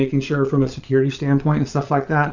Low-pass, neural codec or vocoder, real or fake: 7.2 kHz; vocoder, 22.05 kHz, 80 mel bands, Vocos; fake